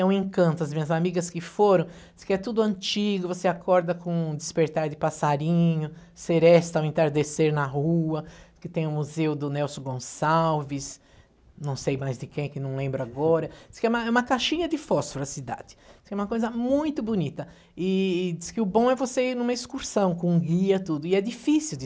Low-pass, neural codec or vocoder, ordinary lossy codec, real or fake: none; none; none; real